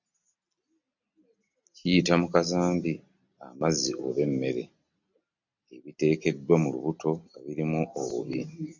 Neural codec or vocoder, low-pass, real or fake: none; 7.2 kHz; real